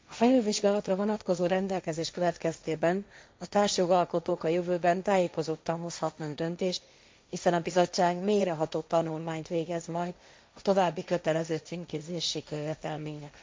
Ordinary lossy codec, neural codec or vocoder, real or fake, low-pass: none; codec, 16 kHz, 1.1 kbps, Voila-Tokenizer; fake; none